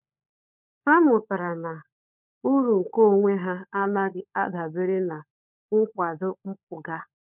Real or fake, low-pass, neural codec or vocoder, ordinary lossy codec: fake; 3.6 kHz; codec, 16 kHz, 16 kbps, FunCodec, trained on LibriTTS, 50 frames a second; none